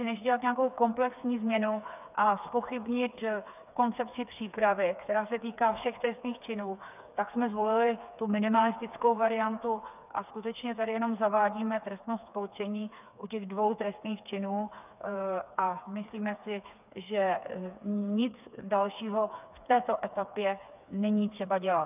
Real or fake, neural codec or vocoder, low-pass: fake; codec, 16 kHz, 4 kbps, FreqCodec, smaller model; 3.6 kHz